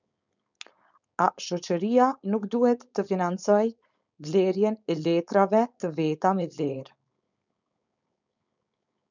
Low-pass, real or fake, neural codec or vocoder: 7.2 kHz; fake; codec, 16 kHz, 4.8 kbps, FACodec